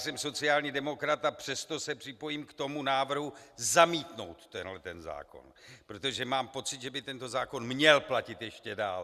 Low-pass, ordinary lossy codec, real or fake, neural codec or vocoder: 14.4 kHz; Opus, 64 kbps; real; none